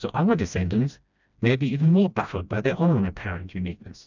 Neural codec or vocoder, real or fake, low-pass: codec, 16 kHz, 1 kbps, FreqCodec, smaller model; fake; 7.2 kHz